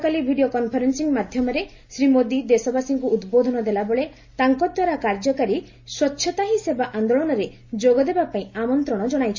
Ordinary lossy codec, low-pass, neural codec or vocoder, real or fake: MP3, 48 kbps; 7.2 kHz; none; real